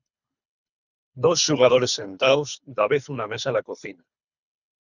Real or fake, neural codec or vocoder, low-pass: fake; codec, 24 kHz, 3 kbps, HILCodec; 7.2 kHz